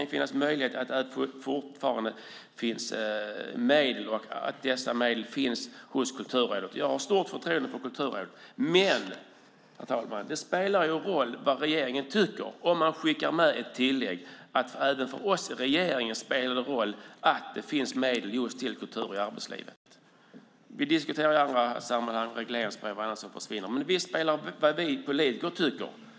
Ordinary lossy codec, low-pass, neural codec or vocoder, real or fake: none; none; none; real